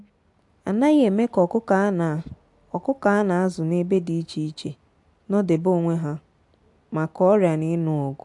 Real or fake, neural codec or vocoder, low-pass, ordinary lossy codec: real; none; 10.8 kHz; AAC, 64 kbps